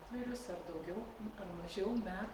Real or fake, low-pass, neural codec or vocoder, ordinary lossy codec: real; 19.8 kHz; none; Opus, 16 kbps